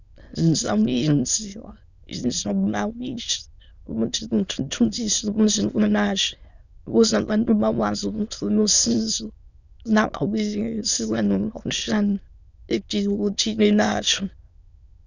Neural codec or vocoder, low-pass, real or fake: autoencoder, 22.05 kHz, a latent of 192 numbers a frame, VITS, trained on many speakers; 7.2 kHz; fake